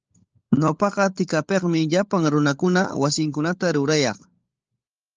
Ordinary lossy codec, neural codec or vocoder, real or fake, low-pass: Opus, 24 kbps; codec, 16 kHz, 16 kbps, FunCodec, trained on LibriTTS, 50 frames a second; fake; 7.2 kHz